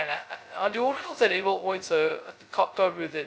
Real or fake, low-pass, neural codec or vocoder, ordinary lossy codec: fake; none; codec, 16 kHz, 0.2 kbps, FocalCodec; none